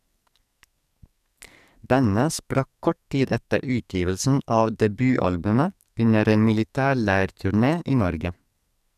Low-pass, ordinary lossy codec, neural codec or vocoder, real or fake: 14.4 kHz; none; codec, 44.1 kHz, 2.6 kbps, SNAC; fake